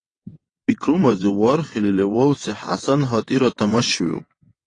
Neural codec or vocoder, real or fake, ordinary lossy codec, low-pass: vocoder, 22.05 kHz, 80 mel bands, WaveNeXt; fake; AAC, 32 kbps; 9.9 kHz